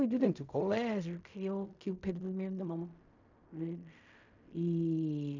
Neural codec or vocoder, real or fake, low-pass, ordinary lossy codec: codec, 16 kHz in and 24 kHz out, 0.4 kbps, LongCat-Audio-Codec, fine tuned four codebook decoder; fake; 7.2 kHz; none